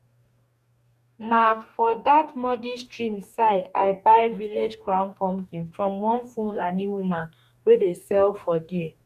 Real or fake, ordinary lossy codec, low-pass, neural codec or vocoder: fake; none; 14.4 kHz; codec, 44.1 kHz, 2.6 kbps, DAC